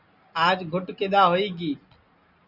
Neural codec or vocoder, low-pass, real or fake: none; 5.4 kHz; real